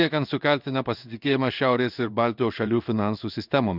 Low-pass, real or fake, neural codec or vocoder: 5.4 kHz; fake; codec, 16 kHz in and 24 kHz out, 1 kbps, XY-Tokenizer